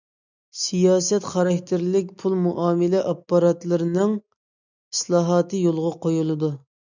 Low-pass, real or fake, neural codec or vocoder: 7.2 kHz; real; none